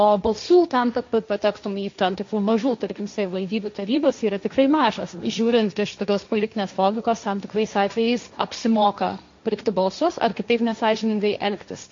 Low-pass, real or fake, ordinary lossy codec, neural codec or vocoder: 7.2 kHz; fake; MP3, 48 kbps; codec, 16 kHz, 1.1 kbps, Voila-Tokenizer